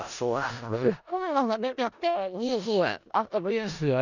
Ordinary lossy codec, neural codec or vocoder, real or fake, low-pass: none; codec, 16 kHz in and 24 kHz out, 0.4 kbps, LongCat-Audio-Codec, four codebook decoder; fake; 7.2 kHz